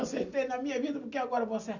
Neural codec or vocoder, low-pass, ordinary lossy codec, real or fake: none; 7.2 kHz; none; real